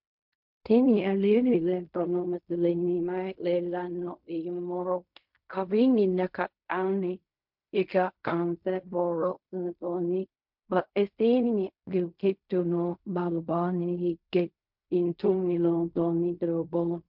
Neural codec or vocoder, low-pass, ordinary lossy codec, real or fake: codec, 16 kHz in and 24 kHz out, 0.4 kbps, LongCat-Audio-Codec, fine tuned four codebook decoder; 5.4 kHz; MP3, 48 kbps; fake